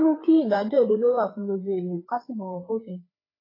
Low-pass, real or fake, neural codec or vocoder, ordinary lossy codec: 5.4 kHz; fake; codec, 16 kHz, 4 kbps, FreqCodec, larger model; AAC, 24 kbps